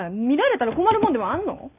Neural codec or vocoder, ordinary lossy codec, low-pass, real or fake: none; none; 3.6 kHz; real